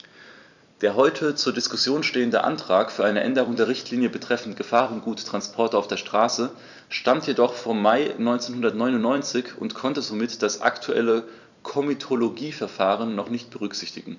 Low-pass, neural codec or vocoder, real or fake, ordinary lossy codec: 7.2 kHz; vocoder, 44.1 kHz, 128 mel bands every 256 samples, BigVGAN v2; fake; none